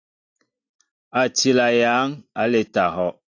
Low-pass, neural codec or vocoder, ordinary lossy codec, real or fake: 7.2 kHz; none; AAC, 48 kbps; real